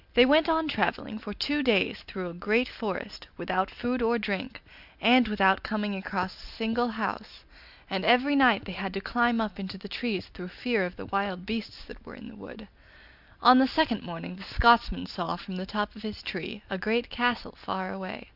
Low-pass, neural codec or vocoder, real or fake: 5.4 kHz; none; real